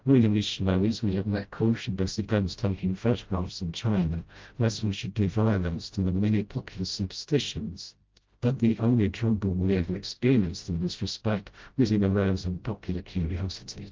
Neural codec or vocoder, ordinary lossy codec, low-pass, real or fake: codec, 16 kHz, 0.5 kbps, FreqCodec, smaller model; Opus, 32 kbps; 7.2 kHz; fake